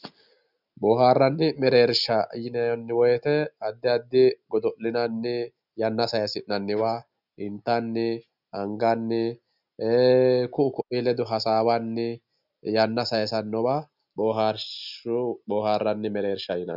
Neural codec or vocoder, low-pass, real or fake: none; 5.4 kHz; real